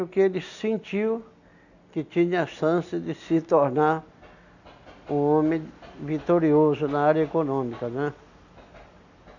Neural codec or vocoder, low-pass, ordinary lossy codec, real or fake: none; 7.2 kHz; none; real